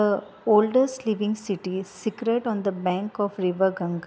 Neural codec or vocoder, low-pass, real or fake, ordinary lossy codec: none; none; real; none